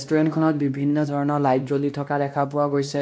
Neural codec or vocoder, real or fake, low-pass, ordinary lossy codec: codec, 16 kHz, 1 kbps, X-Codec, WavLM features, trained on Multilingual LibriSpeech; fake; none; none